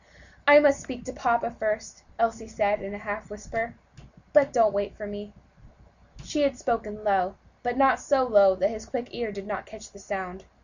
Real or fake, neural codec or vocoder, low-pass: real; none; 7.2 kHz